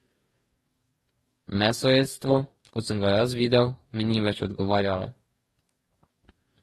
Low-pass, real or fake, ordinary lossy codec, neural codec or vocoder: 19.8 kHz; fake; AAC, 32 kbps; codec, 44.1 kHz, 2.6 kbps, DAC